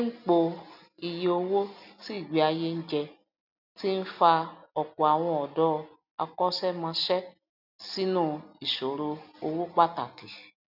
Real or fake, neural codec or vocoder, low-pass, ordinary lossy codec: real; none; 5.4 kHz; none